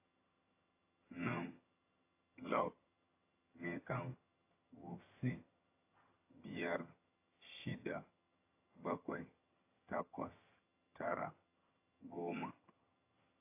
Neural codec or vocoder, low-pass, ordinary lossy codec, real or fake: vocoder, 22.05 kHz, 80 mel bands, HiFi-GAN; 3.6 kHz; AAC, 24 kbps; fake